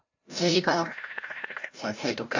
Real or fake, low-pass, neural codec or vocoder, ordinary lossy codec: fake; 7.2 kHz; codec, 16 kHz, 0.5 kbps, FreqCodec, larger model; AAC, 48 kbps